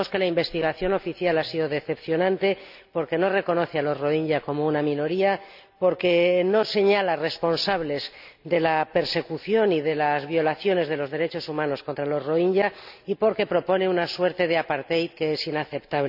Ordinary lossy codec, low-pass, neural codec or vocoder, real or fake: none; 5.4 kHz; none; real